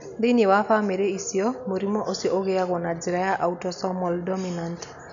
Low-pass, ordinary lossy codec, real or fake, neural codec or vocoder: 7.2 kHz; none; real; none